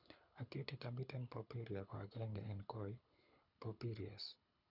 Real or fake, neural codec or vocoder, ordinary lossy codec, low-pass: fake; codec, 24 kHz, 6 kbps, HILCodec; none; 5.4 kHz